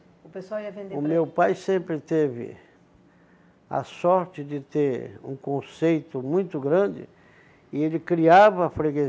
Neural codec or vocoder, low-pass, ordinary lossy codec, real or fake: none; none; none; real